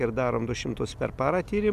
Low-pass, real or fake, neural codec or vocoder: 14.4 kHz; real; none